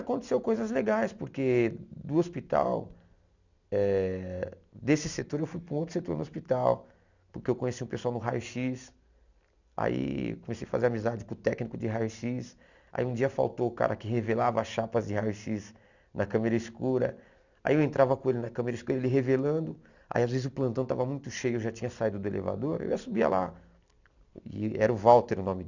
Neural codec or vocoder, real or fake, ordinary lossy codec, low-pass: none; real; none; 7.2 kHz